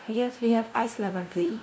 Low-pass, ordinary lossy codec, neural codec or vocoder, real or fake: none; none; codec, 16 kHz, 0.5 kbps, FunCodec, trained on LibriTTS, 25 frames a second; fake